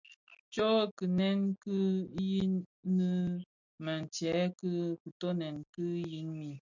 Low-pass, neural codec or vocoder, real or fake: 7.2 kHz; none; real